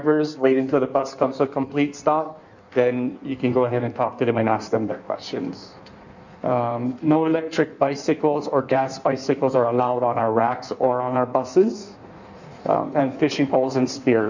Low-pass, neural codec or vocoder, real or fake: 7.2 kHz; codec, 16 kHz in and 24 kHz out, 1.1 kbps, FireRedTTS-2 codec; fake